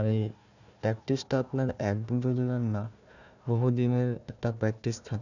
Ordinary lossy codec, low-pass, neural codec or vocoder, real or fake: none; 7.2 kHz; codec, 16 kHz, 1 kbps, FunCodec, trained on Chinese and English, 50 frames a second; fake